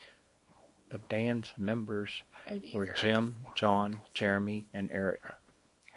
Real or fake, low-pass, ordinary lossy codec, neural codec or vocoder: fake; 10.8 kHz; MP3, 48 kbps; codec, 24 kHz, 0.9 kbps, WavTokenizer, small release